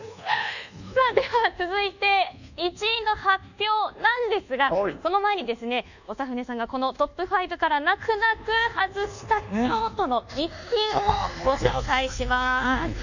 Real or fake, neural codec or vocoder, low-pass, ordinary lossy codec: fake; codec, 24 kHz, 1.2 kbps, DualCodec; 7.2 kHz; none